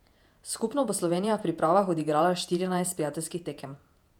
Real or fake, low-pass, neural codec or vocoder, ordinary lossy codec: fake; 19.8 kHz; vocoder, 48 kHz, 128 mel bands, Vocos; none